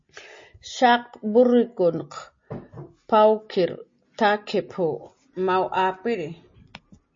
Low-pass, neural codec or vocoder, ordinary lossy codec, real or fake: 7.2 kHz; none; MP3, 32 kbps; real